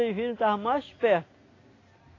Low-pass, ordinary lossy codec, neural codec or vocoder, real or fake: 7.2 kHz; AAC, 32 kbps; none; real